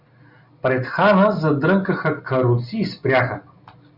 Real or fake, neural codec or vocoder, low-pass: real; none; 5.4 kHz